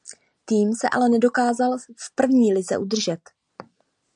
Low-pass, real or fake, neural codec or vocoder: 9.9 kHz; real; none